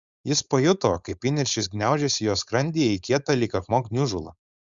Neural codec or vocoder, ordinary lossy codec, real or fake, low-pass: codec, 16 kHz, 4.8 kbps, FACodec; Opus, 64 kbps; fake; 7.2 kHz